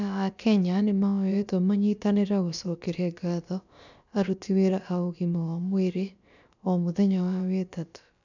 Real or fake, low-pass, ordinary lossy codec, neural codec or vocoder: fake; 7.2 kHz; none; codec, 16 kHz, about 1 kbps, DyCAST, with the encoder's durations